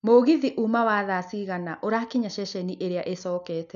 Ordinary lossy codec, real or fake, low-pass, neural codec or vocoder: none; real; 7.2 kHz; none